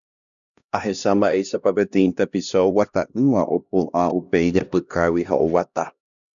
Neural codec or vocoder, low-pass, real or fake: codec, 16 kHz, 1 kbps, X-Codec, HuBERT features, trained on LibriSpeech; 7.2 kHz; fake